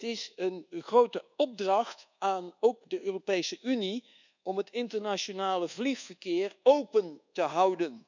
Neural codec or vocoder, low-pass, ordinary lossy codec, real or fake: codec, 24 kHz, 1.2 kbps, DualCodec; 7.2 kHz; none; fake